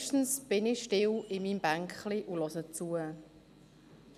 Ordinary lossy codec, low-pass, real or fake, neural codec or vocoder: none; 14.4 kHz; real; none